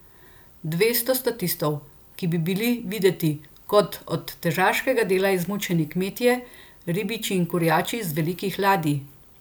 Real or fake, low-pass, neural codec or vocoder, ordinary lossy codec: real; none; none; none